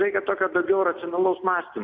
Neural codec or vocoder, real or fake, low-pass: none; real; 7.2 kHz